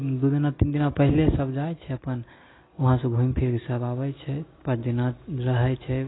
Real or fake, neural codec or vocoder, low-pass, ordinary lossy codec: real; none; 7.2 kHz; AAC, 16 kbps